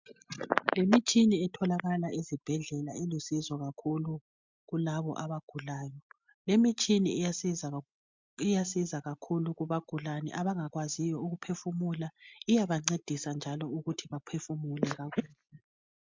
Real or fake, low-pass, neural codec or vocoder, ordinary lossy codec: real; 7.2 kHz; none; MP3, 64 kbps